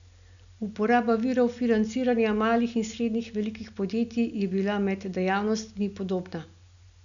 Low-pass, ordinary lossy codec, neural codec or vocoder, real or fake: 7.2 kHz; none; none; real